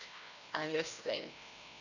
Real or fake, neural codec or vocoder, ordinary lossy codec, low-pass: fake; codec, 16 kHz, 1 kbps, FreqCodec, larger model; none; 7.2 kHz